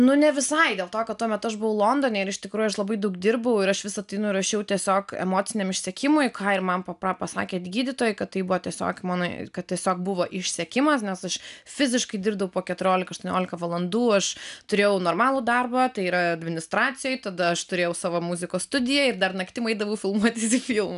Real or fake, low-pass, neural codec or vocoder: real; 10.8 kHz; none